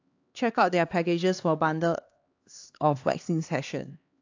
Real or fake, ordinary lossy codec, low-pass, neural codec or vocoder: fake; AAC, 48 kbps; 7.2 kHz; codec, 16 kHz, 2 kbps, X-Codec, HuBERT features, trained on LibriSpeech